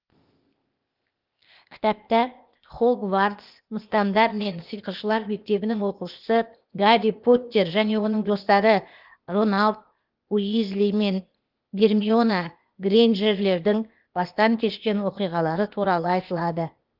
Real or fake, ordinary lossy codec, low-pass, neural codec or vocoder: fake; Opus, 32 kbps; 5.4 kHz; codec, 16 kHz, 0.8 kbps, ZipCodec